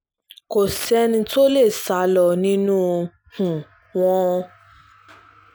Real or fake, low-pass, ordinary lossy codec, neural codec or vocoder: real; none; none; none